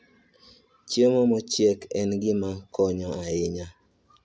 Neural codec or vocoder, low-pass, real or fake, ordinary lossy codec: none; none; real; none